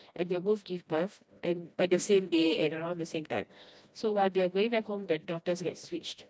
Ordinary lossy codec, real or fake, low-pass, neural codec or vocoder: none; fake; none; codec, 16 kHz, 1 kbps, FreqCodec, smaller model